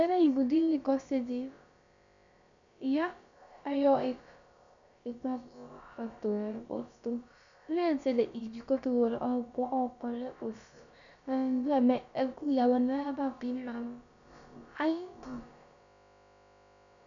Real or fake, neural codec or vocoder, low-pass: fake; codec, 16 kHz, about 1 kbps, DyCAST, with the encoder's durations; 7.2 kHz